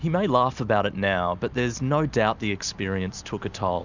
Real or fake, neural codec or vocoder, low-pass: real; none; 7.2 kHz